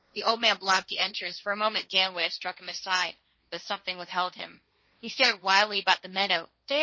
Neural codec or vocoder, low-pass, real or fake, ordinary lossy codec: codec, 16 kHz, 1.1 kbps, Voila-Tokenizer; 7.2 kHz; fake; MP3, 32 kbps